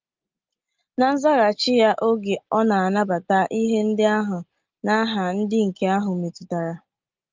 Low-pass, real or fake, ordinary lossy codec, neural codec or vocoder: 7.2 kHz; real; Opus, 32 kbps; none